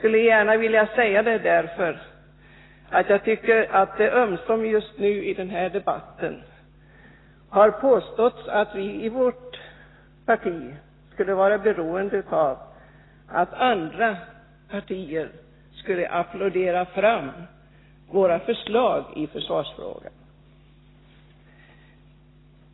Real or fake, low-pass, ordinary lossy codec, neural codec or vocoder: real; 7.2 kHz; AAC, 16 kbps; none